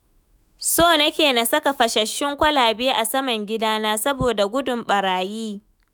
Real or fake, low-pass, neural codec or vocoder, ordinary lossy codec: fake; none; autoencoder, 48 kHz, 128 numbers a frame, DAC-VAE, trained on Japanese speech; none